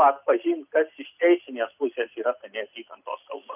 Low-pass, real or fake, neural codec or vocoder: 3.6 kHz; real; none